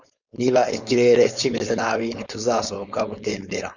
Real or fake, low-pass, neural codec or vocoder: fake; 7.2 kHz; codec, 16 kHz, 4.8 kbps, FACodec